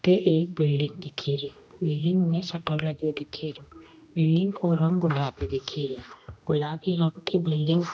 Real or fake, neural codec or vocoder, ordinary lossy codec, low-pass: fake; codec, 16 kHz, 1 kbps, X-Codec, HuBERT features, trained on general audio; none; none